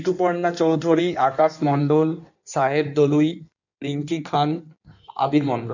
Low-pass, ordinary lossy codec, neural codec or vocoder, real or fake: 7.2 kHz; none; codec, 16 kHz in and 24 kHz out, 1.1 kbps, FireRedTTS-2 codec; fake